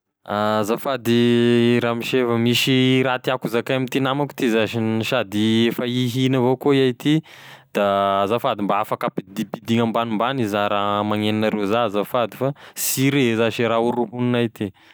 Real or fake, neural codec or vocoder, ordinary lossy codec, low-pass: real; none; none; none